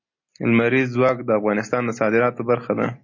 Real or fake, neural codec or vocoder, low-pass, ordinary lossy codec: real; none; 7.2 kHz; MP3, 32 kbps